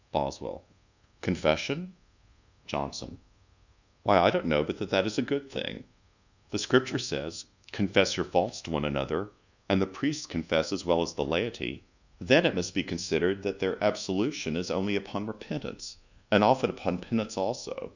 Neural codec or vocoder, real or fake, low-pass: codec, 24 kHz, 1.2 kbps, DualCodec; fake; 7.2 kHz